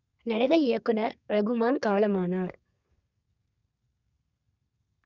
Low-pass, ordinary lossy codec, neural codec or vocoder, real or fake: 7.2 kHz; none; codec, 32 kHz, 1.9 kbps, SNAC; fake